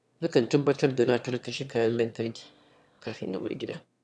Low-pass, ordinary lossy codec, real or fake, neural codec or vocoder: none; none; fake; autoencoder, 22.05 kHz, a latent of 192 numbers a frame, VITS, trained on one speaker